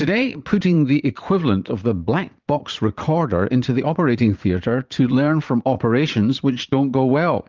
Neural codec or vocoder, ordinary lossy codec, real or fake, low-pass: vocoder, 44.1 kHz, 80 mel bands, Vocos; Opus, 24 kbps; fake; 7.2 kHz